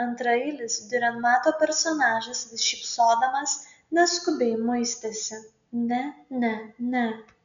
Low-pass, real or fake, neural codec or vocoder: 7.2 kHz; real; none